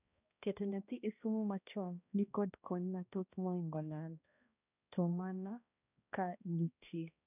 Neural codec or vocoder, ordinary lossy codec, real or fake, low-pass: codec, 16 kHz, 1 kbps, X-Codec, HuBERT features, trained on balanced general audio; none; fake; 3.6 kHz